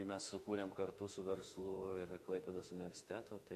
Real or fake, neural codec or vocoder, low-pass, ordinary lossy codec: fake; autoencoder, 48 kHz, 32 numbers a frame, DAC-VAE, trained on Japanese speech; 14.4 kHz; MP3, 64 kbps